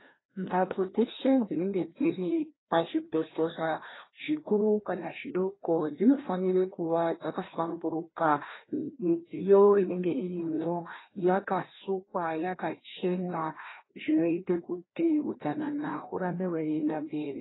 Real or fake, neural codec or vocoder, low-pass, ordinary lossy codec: fake; codec, 16 kHz, 1 kbps, FreqCodec, larger model; 7.2 kHz; AAC, 16 kbps